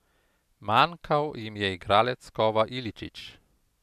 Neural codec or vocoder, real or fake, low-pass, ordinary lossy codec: none; real; 14.4 kHz; none